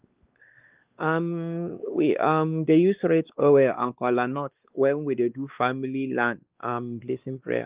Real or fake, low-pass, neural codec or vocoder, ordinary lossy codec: fake; 3.6 kHz; codec, 16 kHz, 2 kbps, X-Codec, WavLM features, trained on Multilingual LibriSpeech; Opus, 32 kbps